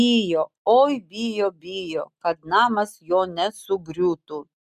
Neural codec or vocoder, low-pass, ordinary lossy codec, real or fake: none; 14.4 kHz; MP3, 96 kbps; real